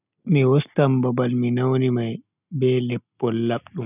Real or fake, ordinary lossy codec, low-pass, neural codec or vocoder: real; none; 3.6 kHz; none